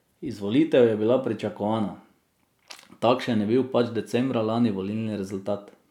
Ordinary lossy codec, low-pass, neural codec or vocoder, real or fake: none; 19.8 kHz; none; real